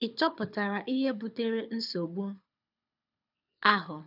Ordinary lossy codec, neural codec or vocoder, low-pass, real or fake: none; codec, 24 kHz, 6 kbps, HILCodec; 5.4 kHz; fake